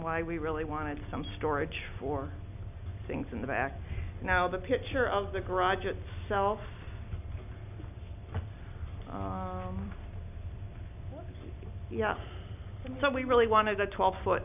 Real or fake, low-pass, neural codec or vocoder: real; 3.6 kHz; none